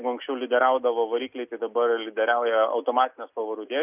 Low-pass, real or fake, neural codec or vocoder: 3.6 kHz; real; none